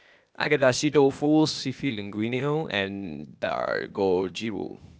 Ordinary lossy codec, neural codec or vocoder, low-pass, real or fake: none; codec, 16 kHz, 0.8 kbps, ZipCodec; none; fake